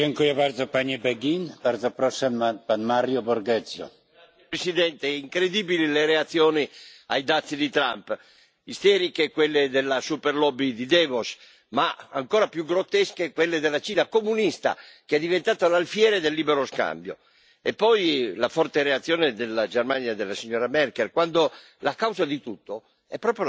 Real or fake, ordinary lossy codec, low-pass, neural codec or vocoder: real; none; none; none